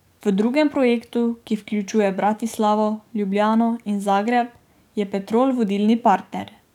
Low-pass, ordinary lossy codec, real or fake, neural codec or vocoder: 19.8 kHz; none; fake; codec, 44.1 kHz, 7.8 kbps, DAC